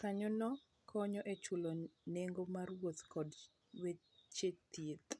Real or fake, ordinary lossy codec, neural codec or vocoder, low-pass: real; none; none; none